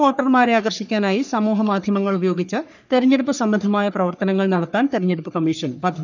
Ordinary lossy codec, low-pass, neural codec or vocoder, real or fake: none; 7.2 kHz; codec, 44.1 kHz, 3.4 kbps, Pupu-Codec; fake